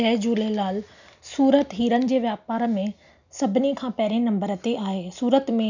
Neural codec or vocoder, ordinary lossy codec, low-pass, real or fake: none; none; 7.2 kHz; real